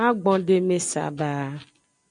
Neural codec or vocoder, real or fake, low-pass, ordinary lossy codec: none; real; 9.9 kHz; MP3, 96 kbps